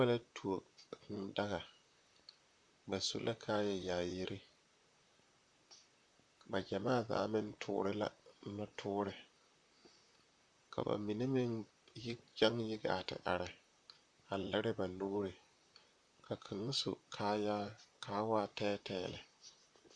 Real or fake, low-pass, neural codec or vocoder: fake; 9.9 kHz; vocoder, 44.1 kHz, 128 mel bands, Pupu-Vocoder